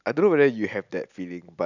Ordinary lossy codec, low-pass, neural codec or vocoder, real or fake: none; 7.2 kHz; none; real